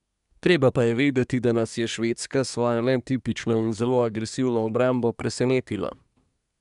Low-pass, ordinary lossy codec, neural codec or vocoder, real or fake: 10.8 kHz; none; codec, 24 kHz, 1 kbps, SNAC; fake